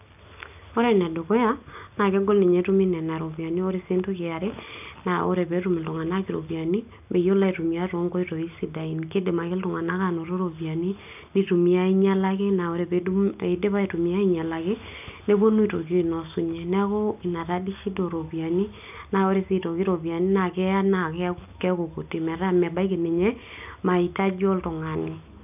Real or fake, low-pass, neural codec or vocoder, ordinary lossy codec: real; 3.6 kHz; none; none